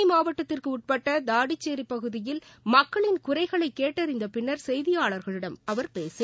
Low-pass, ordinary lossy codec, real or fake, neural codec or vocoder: none; none; real; none